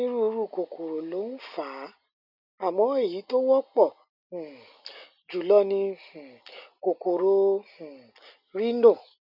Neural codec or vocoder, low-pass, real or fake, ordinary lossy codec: none; 5.4 kHz; real; none